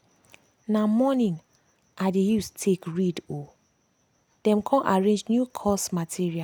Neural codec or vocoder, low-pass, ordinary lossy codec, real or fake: none; none; none; real